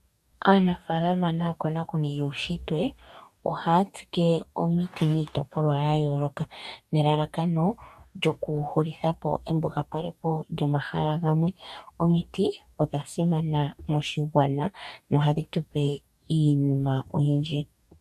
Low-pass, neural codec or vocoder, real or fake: 14.4 kHz; codec, 44.1 kHz, 2.6 kbps, DAC; fake